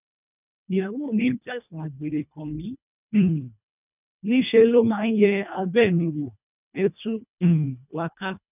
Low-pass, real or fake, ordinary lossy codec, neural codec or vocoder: 3.6 kHz; fake; none; codec, 24 kHz, 1.5 kbps, HILCodec